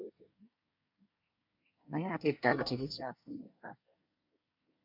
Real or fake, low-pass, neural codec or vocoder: fake; 5.4 kHz; codec, 24 kHz, 1 kbps, SNAC